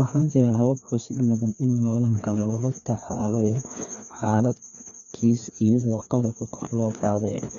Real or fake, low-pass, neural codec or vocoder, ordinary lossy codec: fake; 7.2 kHz; codec, 16 kHz, 2 kbps, FreqCodec, larger model; none